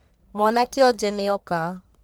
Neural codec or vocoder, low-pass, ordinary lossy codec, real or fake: codec, 44.1 kHz, 1.7 kbps, Pupu-Codec; none; none; fake